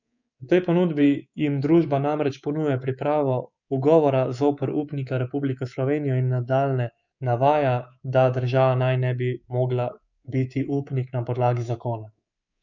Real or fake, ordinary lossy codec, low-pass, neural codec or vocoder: fake; none; 7.2 kHz; codec, 24 kHz, 3.1 kbps, DualCodec